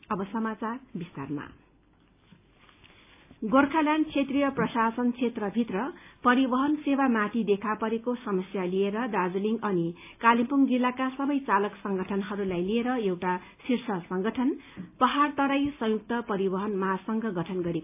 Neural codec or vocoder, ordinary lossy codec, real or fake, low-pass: none; AAC, 32 kbps; real; 3.6 kHz